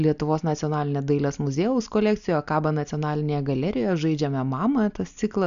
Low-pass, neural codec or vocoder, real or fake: 7.2 kHz; none; real